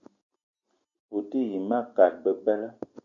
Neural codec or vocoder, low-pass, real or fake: none; 7.2 kHz; real